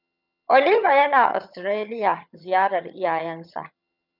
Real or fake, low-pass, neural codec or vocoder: fake; 5.4 kHz; vocoder, 22.05 kHz, 80 mel bands, HiFi-GAN